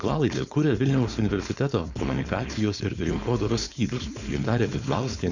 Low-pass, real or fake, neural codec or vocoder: 7.2 kHz; fake; codec, 16 kHz, 4.8 kbps, FACodec